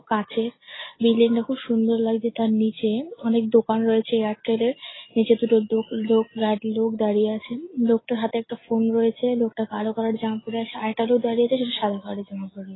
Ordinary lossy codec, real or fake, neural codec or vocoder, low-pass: AAC, 16 kbps; real; none; 7.2 kHz